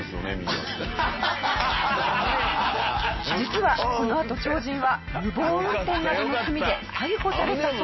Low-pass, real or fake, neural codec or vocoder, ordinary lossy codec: 7.2 kHz; real; none; MP3, 24 kbps